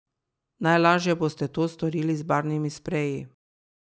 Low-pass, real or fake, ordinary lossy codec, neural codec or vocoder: none; real; none; none